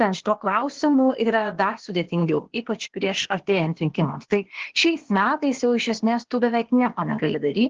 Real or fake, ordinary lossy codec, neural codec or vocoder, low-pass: fake; Opus, 16 kbps; codec, 16 kHz, 0.8 kbps, ZipCodec; 7.2 kHz